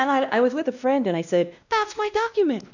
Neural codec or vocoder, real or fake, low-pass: codec, 16 kHz, 1 kbps, X-Codec, WavLM features, trained on Multilingual LibriSpeech; fake; 7.2 kHz